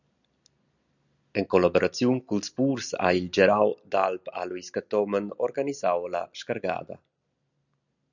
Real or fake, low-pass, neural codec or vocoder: real; 7.2 kHz; none